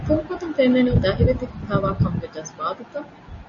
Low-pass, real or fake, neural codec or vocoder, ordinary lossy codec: 7.2 kHz; real; none; MP3, 32 kbps